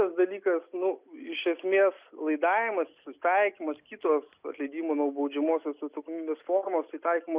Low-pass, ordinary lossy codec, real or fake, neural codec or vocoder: 3.6 kHz; Opus, 64 kbps; real; none